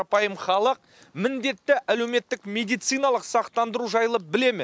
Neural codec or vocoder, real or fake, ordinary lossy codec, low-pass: none; real; none; none